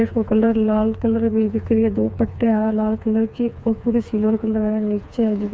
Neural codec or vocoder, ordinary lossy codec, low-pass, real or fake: codec, 16 kHz, 4 kbps, FreqCodec, smaller model; none; none; fake